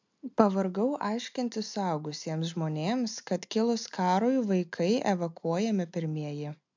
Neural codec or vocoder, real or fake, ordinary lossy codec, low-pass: none; real; MP3, 64 kbps; 7.2 kHz